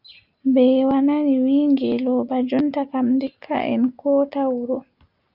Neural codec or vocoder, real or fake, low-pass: none; real; 5.4 kHz